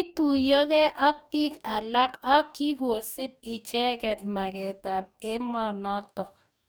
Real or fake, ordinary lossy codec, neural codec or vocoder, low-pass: fake; none; codec, 44.1 kHz, 2.6 kbps, DAC; none